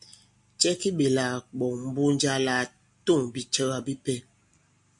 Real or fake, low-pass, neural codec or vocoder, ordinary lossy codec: real; 10.8 kHz; none; MP3, 64 kbps